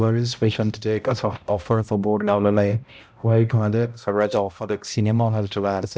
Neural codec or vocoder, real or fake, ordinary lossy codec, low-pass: codec, 16 kHz, 0.5 kbps, X-Codec, HuBERT features, trained on balanced general audio; fake; none; none